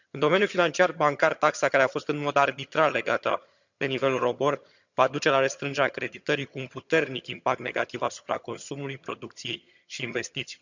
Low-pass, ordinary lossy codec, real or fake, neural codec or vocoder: 7.2 kHz; none; fake; vocoder, 22.05 kHz, 80 mel bands, HiFi-GAN